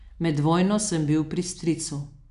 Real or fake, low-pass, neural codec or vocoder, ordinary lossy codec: real; 10.8 kHz; none; none